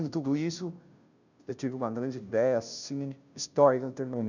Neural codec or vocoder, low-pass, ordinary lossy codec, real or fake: codec, 16 kHz, 0.5 kbps, FunCodec, trained on Chinese and English, 25 frames a second; 7.2 kHz; none; fake